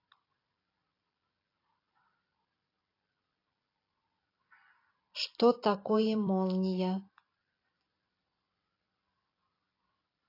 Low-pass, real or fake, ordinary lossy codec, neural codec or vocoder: 5.4 kHz; fake; AAC, 24 kbps; vocoder, 44.1 kHz, 128 mel bands every 256 samples, BigVGAN v2